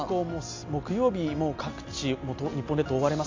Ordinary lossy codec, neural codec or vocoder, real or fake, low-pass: AAC, 48 kbps; none; real; 7.2 kHz